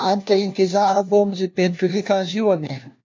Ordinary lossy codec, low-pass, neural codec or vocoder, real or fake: MP3, 48 kbps; 7.2 kHz; codec, 16 kHz, 1 kbps, FunCodec, trained on LibriTTS, 50 frames a second; fake